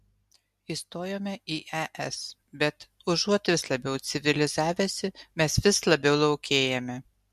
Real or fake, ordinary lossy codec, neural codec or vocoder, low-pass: real; MP3, 64 kbps; none; 14.4 kHz